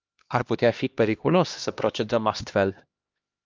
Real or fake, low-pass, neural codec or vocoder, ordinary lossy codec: fake; 7.2 kHz; codec, 16 kHz, 1 kbps, X-Codec, HuBERT features, trained on LibriSpeech; Opus, 24 kbps